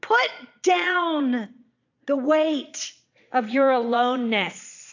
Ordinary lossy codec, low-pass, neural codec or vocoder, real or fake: AAC, 48 kbps; 7.2 kHz; vocoder, 22.05 kHz, 80 mel bands, Vocos; fake